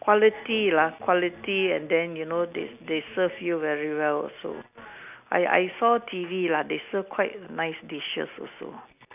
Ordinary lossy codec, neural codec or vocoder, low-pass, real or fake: none; none; 3.6 kHz; real